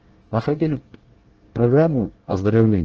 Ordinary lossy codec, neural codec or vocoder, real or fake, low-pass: Opus, 16 kbps; codec, 24 kHz, 1 kbps, SNAC; fake; 7.2 kHz